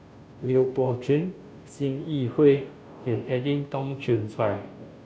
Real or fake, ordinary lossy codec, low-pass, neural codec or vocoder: fake; none; none; codec, 16 kHz, 0.5 kbps, FunCodec, trained on Chinese and English, 25 frames a second